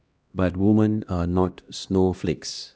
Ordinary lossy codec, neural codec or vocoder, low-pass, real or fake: none; codec, 16 kHz, 1 kbps, X-Codec, HuBERT features, trained on LibriSpeech; none; fake